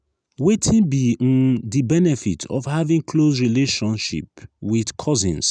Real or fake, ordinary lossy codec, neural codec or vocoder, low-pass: real; none; none; 9.9 kHz